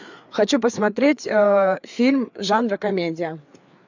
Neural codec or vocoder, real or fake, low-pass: codec, 16 kHz, 4 kbps, FreqCodec, larger model; fake; 7.2 kHz